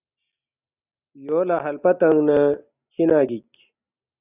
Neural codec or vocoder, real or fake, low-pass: none; real; 3.6 kHz